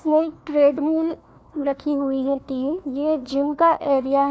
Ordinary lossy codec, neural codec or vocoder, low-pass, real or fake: none; codec, 16 kHz, 1 kbps, FunCodec, trained on Chinese and English, 50 frames a second; none; fake